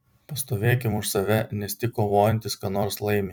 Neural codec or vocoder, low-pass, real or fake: vocoder, 44.1 kHz, 128 mel bands every 256 samples, BigVGAN v2; 19.8 kHz; fake